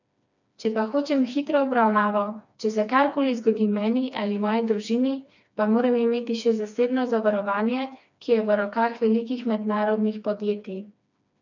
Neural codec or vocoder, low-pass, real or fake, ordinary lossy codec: codec, 16 kHz, 2 kbps, FreqCodec, smaller model; 7.2 kHz; fake; none